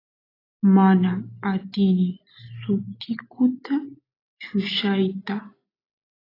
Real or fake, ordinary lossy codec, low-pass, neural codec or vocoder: real; AAC, 24 kbps; 5.4 kHz; none